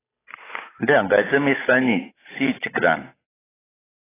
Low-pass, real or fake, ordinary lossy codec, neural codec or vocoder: 3.6 kHz; fake; AAC, 16 kbps; codec, 16 kHz, 8 kbps, FunCodec, trained on Chinese and English, 25 frames a second